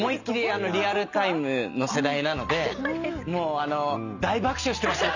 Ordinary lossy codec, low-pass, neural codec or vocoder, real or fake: none; 7.2 kHz; none; real